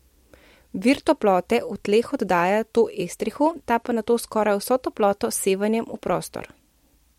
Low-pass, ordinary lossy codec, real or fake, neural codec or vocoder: 19.8 kHz; MP3, 64 kbps; real; none